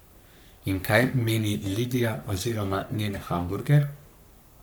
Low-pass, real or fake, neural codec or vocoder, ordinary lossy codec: none; fake; codec, 44.1 kHz, 3.4 kbps, Pupu-Codec; none